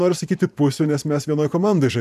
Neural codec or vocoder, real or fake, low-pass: vocoder, 44.1 kHz, 128 mel bands every 512 samples, BigVGAN v2; fake; 14.4 kHz